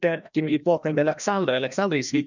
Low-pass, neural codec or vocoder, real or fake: 7.2 kHz; codec, 16 kHz, 1 kbps, FreqCodec, larger model; fake